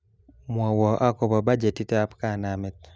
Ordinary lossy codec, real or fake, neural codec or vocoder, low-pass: none; real; none; none